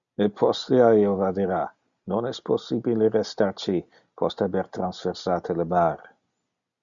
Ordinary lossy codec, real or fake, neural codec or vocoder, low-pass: MP3, 96 kbps; real; none; 7.2 kHz